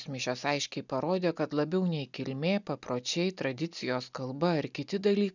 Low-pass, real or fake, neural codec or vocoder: 7.2 kHz; real; none